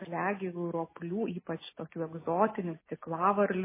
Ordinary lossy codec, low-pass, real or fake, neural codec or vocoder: MP3, 16 kbps; 3.6 kHz; real; none